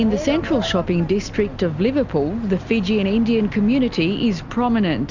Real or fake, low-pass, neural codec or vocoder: real; 7.2 kHz; none